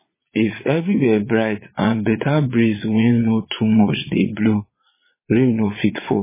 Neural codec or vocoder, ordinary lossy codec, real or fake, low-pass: vocoder, 22.05 kHz, 80 mel bands, Vocos; MP3, 16 kbps; fake; 3.6 kHz